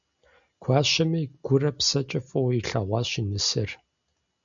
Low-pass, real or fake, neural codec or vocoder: 7.2 kHz; real; none